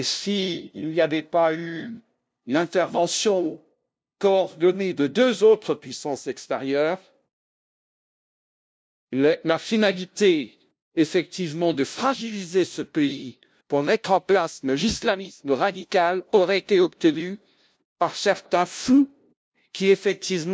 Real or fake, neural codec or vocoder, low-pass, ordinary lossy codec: fake; codec, 16 kHz, 0.5 kbps, FunCodec, trained on LibriTTS, 25 frames a second; none; none